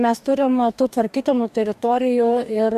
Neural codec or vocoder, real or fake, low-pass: codec, 44.1 kHz, 2.6 kbps, DAC; fake; 14.4 kHz